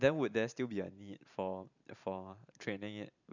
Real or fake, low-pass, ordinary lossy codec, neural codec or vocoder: fake; 7.2 kHz; none; vocoder, 44.1 kHz, 128 mel bands every 512 samples, BigVGAN v2